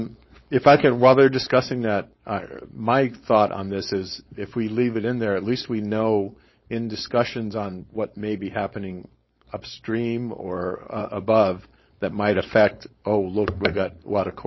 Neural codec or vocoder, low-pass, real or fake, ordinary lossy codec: codec, 16 kHz, 4.8 kbps, FACodec; 7.2 kHz; fake; MP3, 24 kbps